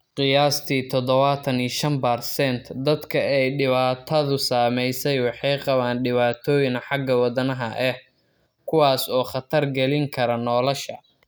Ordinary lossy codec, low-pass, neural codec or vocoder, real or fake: none; none; none; real